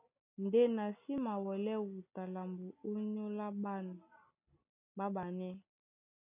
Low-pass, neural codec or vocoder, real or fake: 3.6 kHz; none; real